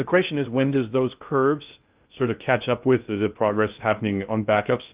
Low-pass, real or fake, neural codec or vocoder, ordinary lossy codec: 3.6 kHz; fake; codec, 16 kHz in and 24 kHz out, 0.6 kbps, FocalCodec, streaming, 2048 codes; Opus, 32 kbps